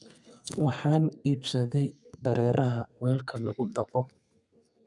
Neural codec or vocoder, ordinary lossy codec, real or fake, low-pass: codec, 32 kHz, 1.9 kbps, SNAC; none; fake; 10.8 kHz